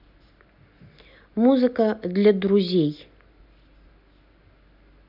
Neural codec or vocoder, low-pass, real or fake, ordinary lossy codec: none; 5.4 kHz; real; none